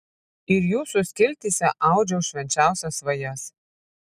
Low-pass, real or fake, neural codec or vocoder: 14.4 kHz; real; none